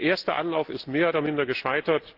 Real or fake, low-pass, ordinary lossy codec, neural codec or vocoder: real; 5.4 kHz; Opus, 16 kbps; none